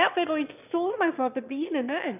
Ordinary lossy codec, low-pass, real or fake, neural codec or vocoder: none; 3.6 kHz; fake; autoencoder, 22.05 kHz, a latent of 192 numbers a frame, VITS, trained on one speaker